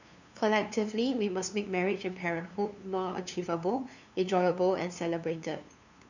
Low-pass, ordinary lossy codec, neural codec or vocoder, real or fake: 7.2 kHz; none; codec, 16 kHz, 2 kbps, FunCodec, trained on LibriTTS, 25 frames a second; fake